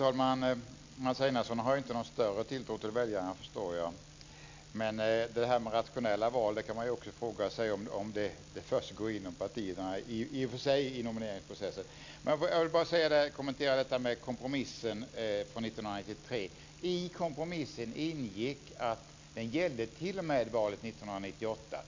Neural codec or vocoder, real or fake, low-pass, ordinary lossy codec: none; real; 7.2 kHz; MP3, 48 kbps